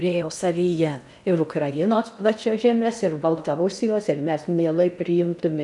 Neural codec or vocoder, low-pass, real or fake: codec, 16 kHz in and 24 kHz out, 0.6 kbps, FocalCodec, streaming, 2048 codes; 10.8 kHz; fake